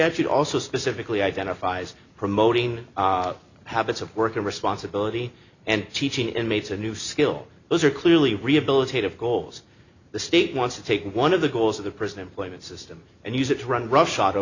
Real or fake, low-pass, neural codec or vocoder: real; 7.2 kHz; none